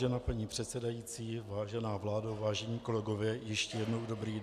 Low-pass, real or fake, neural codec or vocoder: 14.4 kHz; real; none